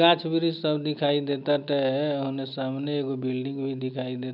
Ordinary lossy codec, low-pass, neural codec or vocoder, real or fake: none; 5.4 kHz; none; real